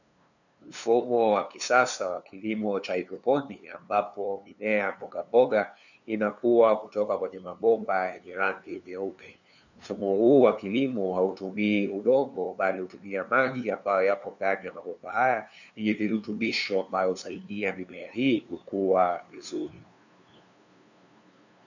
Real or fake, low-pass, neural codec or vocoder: fake; 7.2 kHz; codec, 16 kHz, 2 kbps, FunCodec, trained on LibriTTS, 25 frames a second